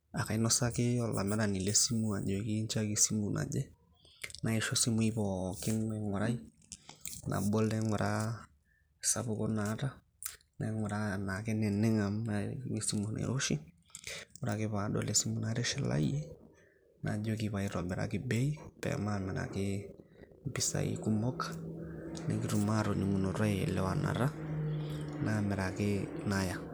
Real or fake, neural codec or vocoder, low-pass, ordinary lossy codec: real; none; none; none